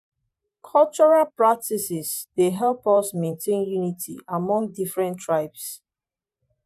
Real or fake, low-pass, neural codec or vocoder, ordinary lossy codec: fake; 14.4 kHz; vocoder, 44.1 kHz, 128 mel bands every 512 samples, BigVGAN v2; none